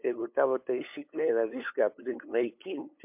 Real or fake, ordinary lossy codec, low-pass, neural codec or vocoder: fake; MP3, 32 kbps; 3.6 kHz; codec, 16 kHz, 8 kbps, FunCodec, trained on LibriTTS, 25 frames a second